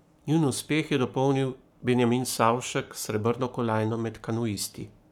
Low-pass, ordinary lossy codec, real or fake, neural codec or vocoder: 19.8 kHz; none; fake; codec, 44.1 kHz, 7.8 kbps, Pupu-Codec